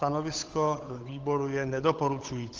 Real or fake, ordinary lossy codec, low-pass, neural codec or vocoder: fake; Opus, 32 kbps; 7.2 kHz; codec, 16 kHz, 8 kbps, FunCodec, trained on Chinese and English, 25 frames a second